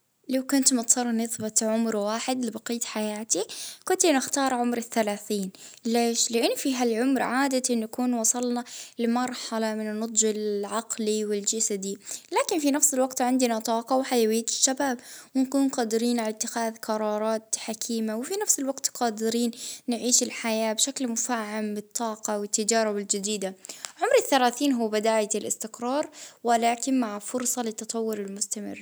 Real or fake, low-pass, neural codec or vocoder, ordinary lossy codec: real; none; none; none